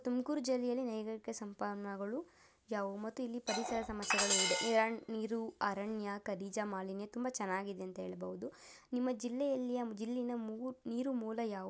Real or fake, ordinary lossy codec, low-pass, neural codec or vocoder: real; none; none; none